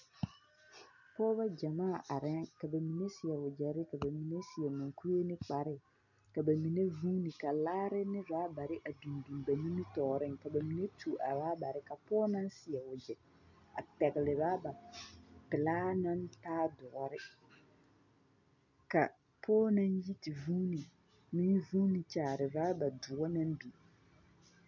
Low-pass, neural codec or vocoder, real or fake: 7.2 kHz; none; real